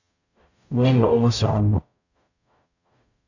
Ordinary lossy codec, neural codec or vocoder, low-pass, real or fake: none; codec, 44.1 kHz, 0.9 kbps, DAC; 7.2 kHz; fake